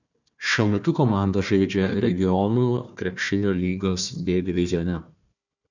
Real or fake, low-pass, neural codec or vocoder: fake; 7.2 kHz; codec, 16 kHz, 1 kbps, FunCodec, trained on Chinese and English, 50 frames a second